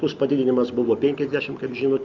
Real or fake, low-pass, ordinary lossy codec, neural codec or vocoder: real; 7.2 kHz; Opus, 24 kbps; none